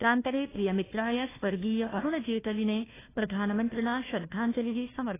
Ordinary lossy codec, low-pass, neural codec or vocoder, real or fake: AAC, 16 kbps; 3.6 kHz; codec, 16 kHz, 1 kbps, FunCodec, trained on Chinese and English, 50 frames a second; fake